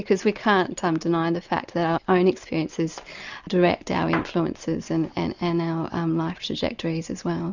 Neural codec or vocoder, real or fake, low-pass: none; real; 7.2 kHz